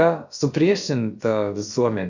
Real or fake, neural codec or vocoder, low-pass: fake; codec, 16 kHz, about 1 kbps, DyCAST, with the encoder's durations; 7.2 kHz